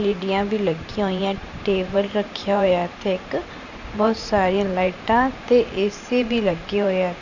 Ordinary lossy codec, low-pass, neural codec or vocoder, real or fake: none; 7.2 kHz; vocoder, 44.1 kHz, 128 mel bands every 512 samples, BigVGAN v2; fake